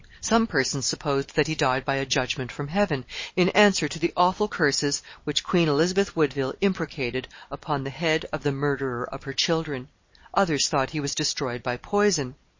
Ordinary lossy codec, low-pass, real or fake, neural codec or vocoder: MP3, 32 kbps; 7.2 kHz; real; none